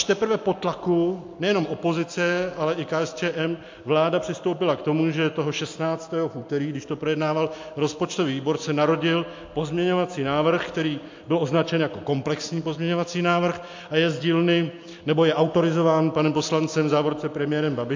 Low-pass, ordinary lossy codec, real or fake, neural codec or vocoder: 7.2 kHz; MP3, 48 kbps; real; none